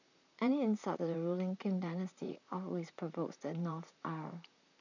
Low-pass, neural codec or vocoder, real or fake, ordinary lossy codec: 7.2 kHz; vocoder, 44.1 kHz, 128 mel bands, Pupu-Vocoder; fake; none